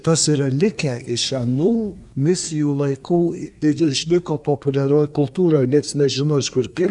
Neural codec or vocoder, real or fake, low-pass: codec, 24 kHz, 1 kbps, SNAC; fake; 10.8 kHz